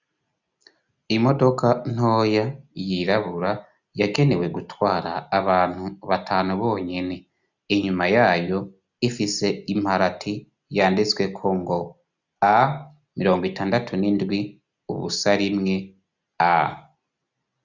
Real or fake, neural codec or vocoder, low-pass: real; none; 7.2 kHz